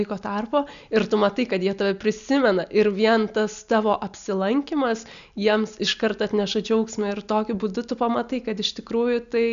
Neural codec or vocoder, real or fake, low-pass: none; real; 7.2 kHz